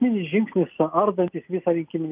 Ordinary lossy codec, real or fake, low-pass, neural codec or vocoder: Opus, 24 kbps; real; 3.6 kHz; none